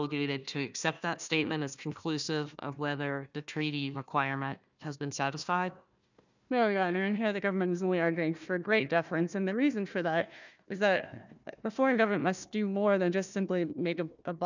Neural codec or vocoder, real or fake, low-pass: codec, 16 kHz, 1 kbps, FunCodec, trained on Chinese and English, 50 frames a second; fake; 7.2 kHz